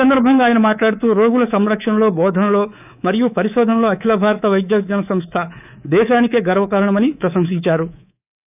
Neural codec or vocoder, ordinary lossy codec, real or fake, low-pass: codec, 24 kHz, 3.1 kbps, DualCodec; none; fake; 3.6 kHz